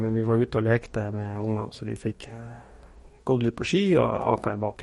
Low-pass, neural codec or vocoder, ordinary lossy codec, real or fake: 19.8 kHz; codec, 44.1 kHz, 2.6 kbps, DAC; MP3, 48 kbps; fake